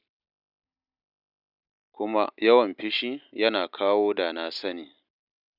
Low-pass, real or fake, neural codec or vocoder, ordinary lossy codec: 5.4 kHz; real; none; none